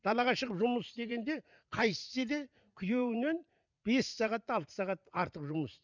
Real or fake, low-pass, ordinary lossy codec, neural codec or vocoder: fake; 7.2 kHz; none; vocoder, 44.1 kHz, 80 mel bands, Vocos